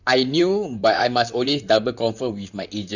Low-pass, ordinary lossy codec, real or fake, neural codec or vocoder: 7.2 kHz; none; fake; vocoder, 44.1 kHz, 128 mel bands, Pupu-Vocoder